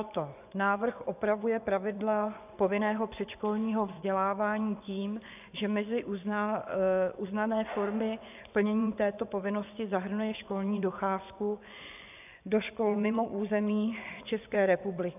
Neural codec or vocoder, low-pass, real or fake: vocoder, 24 kHz, 100 mel bands, Vocos; 3.6 kHz; fake